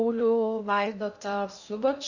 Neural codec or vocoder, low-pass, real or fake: codec, 16 kHz in and 24 kHz out, 0.6 kbps, FocalCodec, streaming, 2048 codes; 7.2 kHz; fake